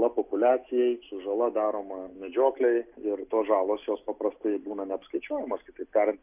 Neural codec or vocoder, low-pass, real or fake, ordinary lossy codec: none; 3.6 kHz; real; AAC, 32 kbps